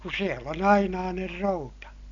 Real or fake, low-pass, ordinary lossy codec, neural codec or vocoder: real; 7.2 kHz; none; none